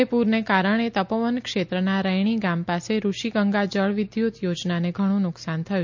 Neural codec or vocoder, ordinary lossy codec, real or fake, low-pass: none; MP3, 48 kbps; real; 7.2 kHz